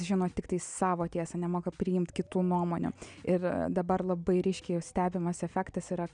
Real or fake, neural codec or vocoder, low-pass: real; none; 9.9 kHz